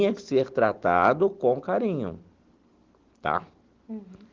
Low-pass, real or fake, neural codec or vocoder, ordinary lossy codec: 7.2 kHz; real; none; Opus, 16 kbps